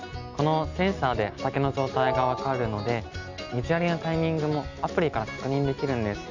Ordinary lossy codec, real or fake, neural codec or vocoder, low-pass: none; real; none; 7.2 kHz